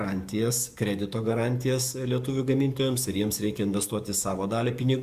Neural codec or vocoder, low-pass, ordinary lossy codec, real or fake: codec, 44.1 kHz, 7.8 kbps, DAC; 14.4 kHz; Opus, 64 kbps; fake